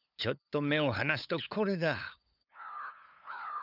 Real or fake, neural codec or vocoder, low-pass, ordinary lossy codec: fake; codec, 16 kHz, 8 kbps, FunCodec, trained on LibriTTS, 25 frames a second; 5.4 kHz; none